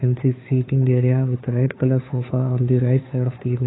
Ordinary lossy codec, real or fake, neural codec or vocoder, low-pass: AAC, 16 kbps; fake; codec, 16 kHz, 2 kbps, FunCodec, trained on Chinese and English, 25 frames a second; 7.2 kHz